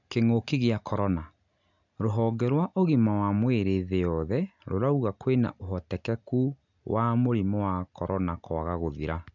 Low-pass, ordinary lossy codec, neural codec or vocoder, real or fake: 7.2 kHz; none; none; real